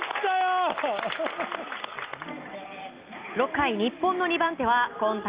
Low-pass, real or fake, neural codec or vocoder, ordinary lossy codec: 3.6 kHz; real; none; Opus, 24 kbps